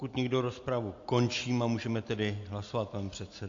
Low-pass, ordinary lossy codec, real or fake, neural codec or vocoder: 7.2 kHz; AAC, 48 kbps; real; none